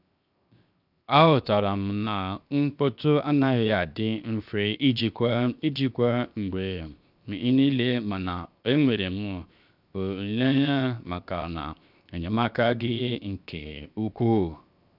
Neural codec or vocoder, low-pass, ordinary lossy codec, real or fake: codec, 16 kHz, 0.7 kbps, FocalCodec; 5.4 kHz; none; fake